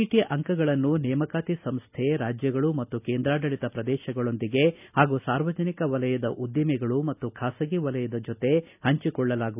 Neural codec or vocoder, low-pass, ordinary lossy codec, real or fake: none; 3.6 kHz; none; real